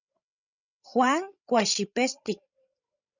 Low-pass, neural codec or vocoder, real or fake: 7.2 kHz; codec, 16 kHz, 16 kbps, FreqCodec, larger model; fake